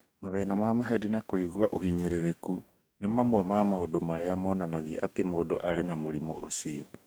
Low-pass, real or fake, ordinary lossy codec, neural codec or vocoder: none; fake; none; codec, 44.1 kHz, 2.6 kbps, DAC